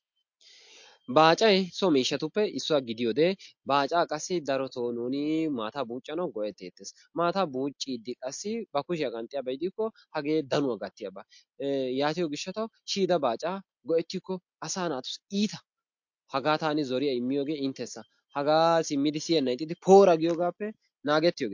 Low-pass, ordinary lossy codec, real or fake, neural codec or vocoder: 7.2 kHz; MP3, 48 kbps; real; none